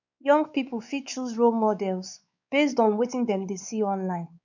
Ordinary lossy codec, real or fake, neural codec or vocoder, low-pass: none; fake; codec, 16 kHz, 4 kbps, X-Codec, WavLM features, trained on Multilingual LibriSpeech; 7.2 kHz